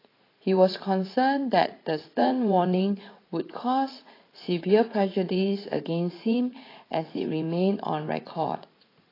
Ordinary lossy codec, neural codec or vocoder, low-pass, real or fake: AAC, 24 kbps; vocoder, 44.1 kHz, 80 mel bands, Vocos; 5.4 kHz; fake